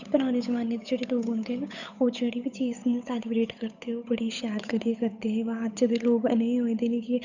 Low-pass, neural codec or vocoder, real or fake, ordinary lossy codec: 7.2 kHz; codec, 16 kHz, 8 kbps, FunCodec, trained on Chinese and English, 25 frames a second; fake; none